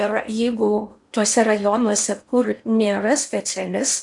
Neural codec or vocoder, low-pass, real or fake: codec, 16 kHz in and 24 kHz out, 0.8 kbps, FocalCodec, streaming, 65536 codes; 10.8 kHz; fake